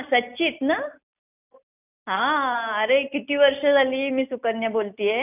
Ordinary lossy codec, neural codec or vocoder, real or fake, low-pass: none; none; real; 3.6 kHz